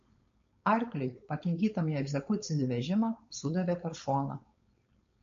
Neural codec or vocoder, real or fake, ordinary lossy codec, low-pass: codec, 16 kHz, 4.8 kbps, FACodec; fake; MP3, 48 kbps; 7.2 kHz